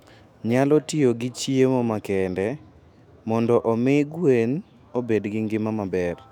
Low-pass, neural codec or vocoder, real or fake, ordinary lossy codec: 19.8 kHz; autoencoder, 48 kHz, 128 numbers a frame, DAC-VAE, trained on Japanese speech; fake; none